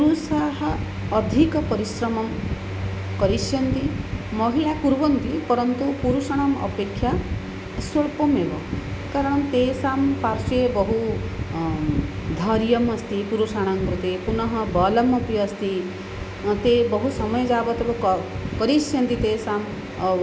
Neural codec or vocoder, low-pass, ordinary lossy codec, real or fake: none; none; none; real